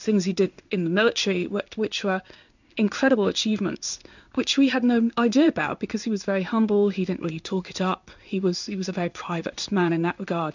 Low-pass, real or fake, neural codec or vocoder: 7.2 kHz; fake; codec, 16 kHz in and 24 kHz out, 1 kbps, XY-Tokenizer